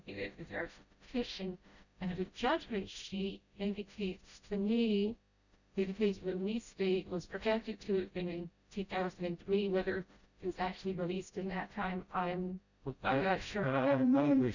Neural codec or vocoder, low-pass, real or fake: codec, 16 kHz, 0.5 kbps, FreqCodec, smaller model; 7.2 kHz; fake